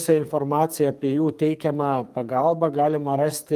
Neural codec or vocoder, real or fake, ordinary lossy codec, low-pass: codec, 44.1 kHz, 2.6 kbps, SNAC; fake; Opus, 32 kbps; 14.4 kHz